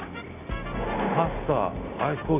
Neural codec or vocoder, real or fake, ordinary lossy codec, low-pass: none; real; none; 3.6 kHz